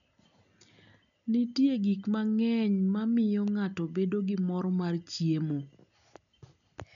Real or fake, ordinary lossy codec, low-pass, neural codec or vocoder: real; none; 7.2 kHz; none